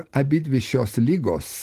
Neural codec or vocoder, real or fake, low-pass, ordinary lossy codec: none; real; 14.4 kHz; Opus, 16 kbps